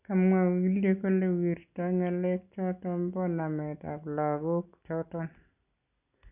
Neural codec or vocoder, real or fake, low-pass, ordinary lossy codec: none; real; 3.6 kHz; none